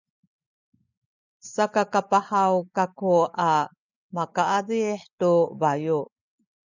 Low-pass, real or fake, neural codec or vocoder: 7.2 kHz; real; none